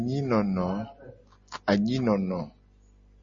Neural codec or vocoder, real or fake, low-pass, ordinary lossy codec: none; real; 7.2 kHz; MP3, 32 kbps